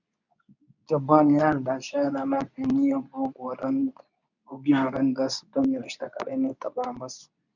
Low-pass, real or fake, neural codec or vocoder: 7.2 kHz; fake; codec, 24 kHz, 0.9 kbps, WavTokenizer, medium speech release version 2